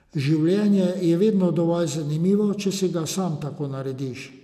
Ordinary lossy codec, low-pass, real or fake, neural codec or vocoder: none; 14.4 kHz; real; none